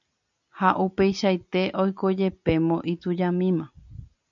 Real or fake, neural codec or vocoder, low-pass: real; none; 7.2 kHz